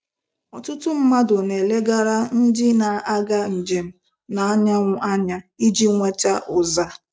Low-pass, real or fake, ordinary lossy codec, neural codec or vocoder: none; real; none; none